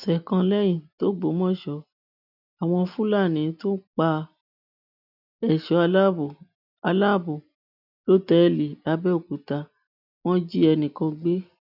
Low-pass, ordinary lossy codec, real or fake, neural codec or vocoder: 5.4 kHz; none; real; none